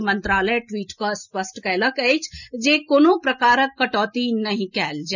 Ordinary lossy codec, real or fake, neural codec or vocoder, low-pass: none; real; none; 7.2 kHz